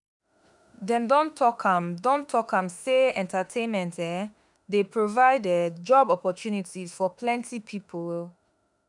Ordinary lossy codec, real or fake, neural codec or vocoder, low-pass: none; fake; autoencoder, 48 kHz, 32 numbers a frame, DAC-VAE, trained on Japanese speech; 10.8 kHz